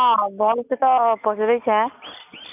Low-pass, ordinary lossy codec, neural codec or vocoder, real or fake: 3.6 kHz; none; none; real